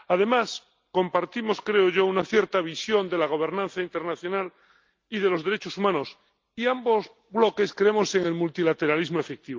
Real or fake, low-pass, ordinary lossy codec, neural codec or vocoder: real; 7.2 kHz; Opus, 32 kbps; none